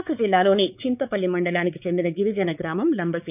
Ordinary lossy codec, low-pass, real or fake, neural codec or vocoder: none; 3.6 kHz; fake; codec, 16 kHz, 4 kbps, X-Codec, WavLM features, trained on Multilingual LibriSpeech